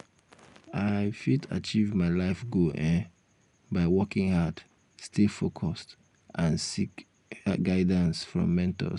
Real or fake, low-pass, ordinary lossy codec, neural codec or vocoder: real; 10.8 kHz; none; none